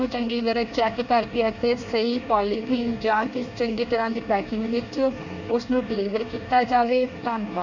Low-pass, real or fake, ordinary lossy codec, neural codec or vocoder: 7.2 kHz; fake; none; codec, 24 kHz, 1 kbps, SNAC